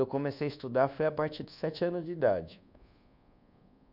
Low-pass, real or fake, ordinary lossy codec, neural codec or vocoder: 5.4 kHz; fake; none; codec, 24 kHz, 1.2 kbps, DualCodec